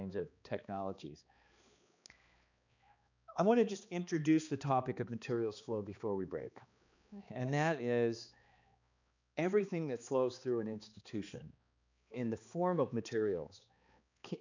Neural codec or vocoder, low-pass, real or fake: codec, 16 kHz, 2 kbps, X-Codec, HuBERT features, trained on balanced general audio; 7.2 kHz; fake